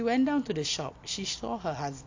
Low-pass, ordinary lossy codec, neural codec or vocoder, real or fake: 7.2 kHz; AAC, 48 kbps; none; real